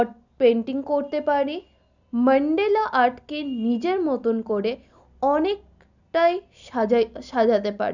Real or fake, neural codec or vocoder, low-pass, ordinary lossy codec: real; none; 7.2 kHz; none